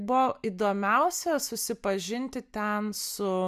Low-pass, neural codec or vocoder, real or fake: 14.4 kHz; none; real